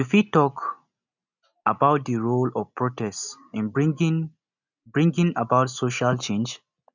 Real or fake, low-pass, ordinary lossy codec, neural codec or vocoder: real; 7.2 kHz; none; none